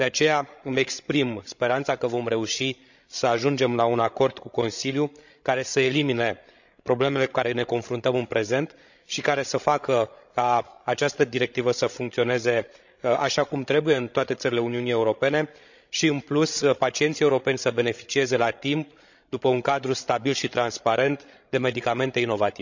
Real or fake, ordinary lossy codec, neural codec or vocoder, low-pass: fake; none; codec, 16 kHz, 16 kbps, FreqCodec, larger model; 7.2 kHz